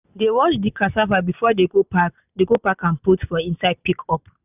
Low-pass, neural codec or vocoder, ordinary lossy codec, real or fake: 3.6 kHz; none; none; real